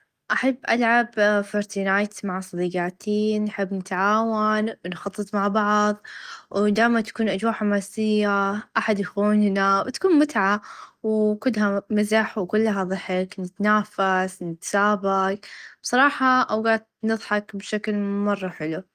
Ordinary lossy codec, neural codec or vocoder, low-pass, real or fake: Opus, 32 kbps; none; 14.4 kHz; real